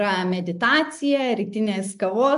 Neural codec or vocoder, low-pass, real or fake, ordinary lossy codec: none; 10.8 kHz; real; MP3, 64 kbps